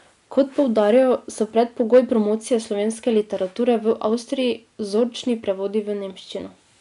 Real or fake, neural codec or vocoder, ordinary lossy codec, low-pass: real; none; none; 10.8 kHz